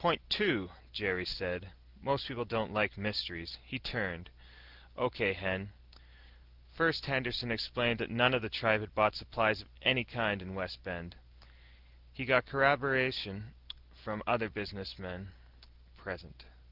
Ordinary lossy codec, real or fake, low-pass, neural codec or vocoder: Opus, 32 kbps; real; 5.4 kHz; none